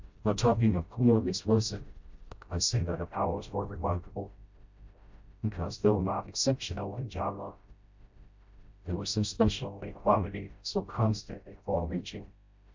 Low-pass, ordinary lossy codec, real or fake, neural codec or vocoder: 7.2 kHz; MP3, 64 kbps; fake; codec, 16 kHz, 0.5 kbps, FreqCodec, smaller model